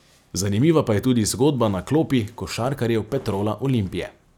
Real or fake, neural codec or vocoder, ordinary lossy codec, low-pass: real; none; none; 19.8 kHz